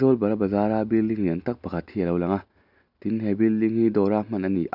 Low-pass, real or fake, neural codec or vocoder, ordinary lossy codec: 5.4 kHz; real; none; none